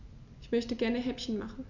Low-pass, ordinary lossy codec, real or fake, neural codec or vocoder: 7.2 kHz; none; real; none